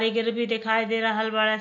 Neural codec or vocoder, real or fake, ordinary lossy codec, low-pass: none; real; MP3, 64 kbps; 7.2 kHz